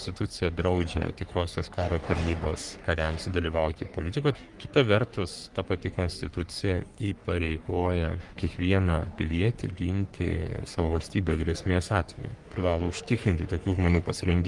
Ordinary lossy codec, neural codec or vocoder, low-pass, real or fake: Opus, 32 kbps; codec, 44.1 kHz, 3.4 kbps, Pupu-Codec; 10.8 kHz; fake